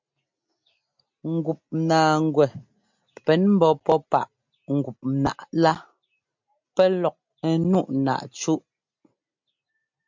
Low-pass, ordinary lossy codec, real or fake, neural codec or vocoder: 7.2 kHz; MP3, 64 kbps; real; none